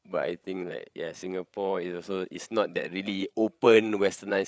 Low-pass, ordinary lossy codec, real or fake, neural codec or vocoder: none; none; fake; codec, 16 kHz, 8 kbps, FreqCodec, larger model